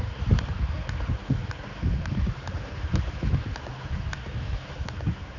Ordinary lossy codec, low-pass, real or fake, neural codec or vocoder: none; 7.2 kHz; fake; codec, 16 kHz, 4 kbps, X-Codec, HuBERT features, trained on general audio